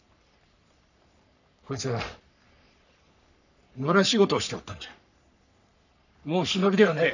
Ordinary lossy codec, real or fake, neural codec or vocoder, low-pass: none; fake; codec, 44.1 kHz, 3.4 kbps, Pupu-Codec; 7.2 kHz